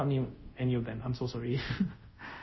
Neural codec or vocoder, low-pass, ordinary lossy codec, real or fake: codec, 24 kHz, 0.5 kbps, DualCodec; 7.2 kHz; MP3, 24 kbps; fake